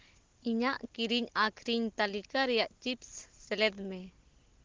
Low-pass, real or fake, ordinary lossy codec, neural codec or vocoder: 7.2 kHz; real; Opus, 16 kbps; none